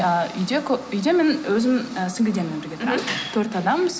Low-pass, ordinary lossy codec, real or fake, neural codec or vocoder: none; none; real; none